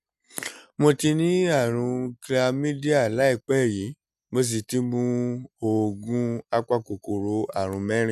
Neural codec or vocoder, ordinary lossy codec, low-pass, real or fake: none; none; 14.4 kHz; real